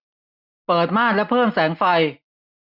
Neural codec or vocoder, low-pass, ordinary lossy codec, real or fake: none; 5.4 kHz; none; real